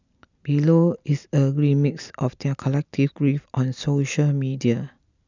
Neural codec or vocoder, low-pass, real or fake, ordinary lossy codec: none; 7.2 kHz; real; none